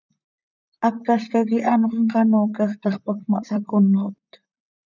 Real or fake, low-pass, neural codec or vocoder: fake; 7.2 kHz; vocoder, 44.1 kHz, 128 mel bands, Pupu-Vocoder